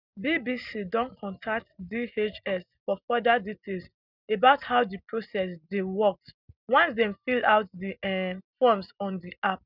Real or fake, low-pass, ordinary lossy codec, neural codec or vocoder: real; 5.4 kHz; none; none